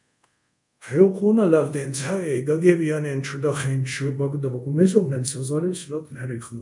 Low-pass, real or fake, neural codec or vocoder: 10.8 kHz; fake; codec, 24 kHz, 0.5 kbps, DualCodec